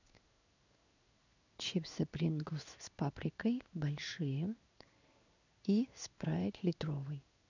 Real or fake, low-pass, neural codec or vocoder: fake; 7.2 kHz; codec, 16 kHz in and 24 kHz out, 1 kbps, XY-Tokenizer